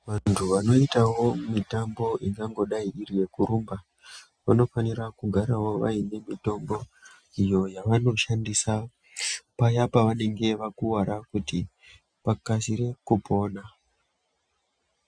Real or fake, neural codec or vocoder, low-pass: real; none; 9.9 kHz